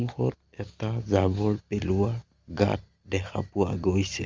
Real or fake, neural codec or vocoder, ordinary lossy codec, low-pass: real; none; Opus, 32 kbps; 7.2 kHz